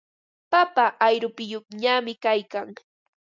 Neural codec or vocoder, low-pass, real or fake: none; 7.2 kHz; real